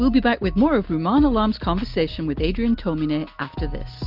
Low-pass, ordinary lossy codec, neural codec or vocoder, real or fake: 5.4 kHz; Opus, 32 kbps; none; real